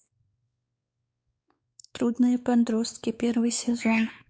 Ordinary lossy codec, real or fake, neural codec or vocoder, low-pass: none; fake; codec, 16 kHz, 4 kbps, X-Codec, WavLM features, trained on Multilingual LibriSpeech; none